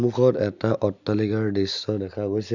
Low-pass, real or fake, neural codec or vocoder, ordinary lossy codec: 7.2 kHz; real; none; none